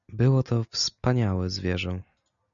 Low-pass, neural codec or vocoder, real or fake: 7.2 kHz; none; real